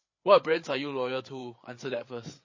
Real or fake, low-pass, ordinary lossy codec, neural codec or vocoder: fake; 7.2 kHz; MP3, 32 kbps; codec, 16 kHz, 8 kbps, FreqCodec, larger model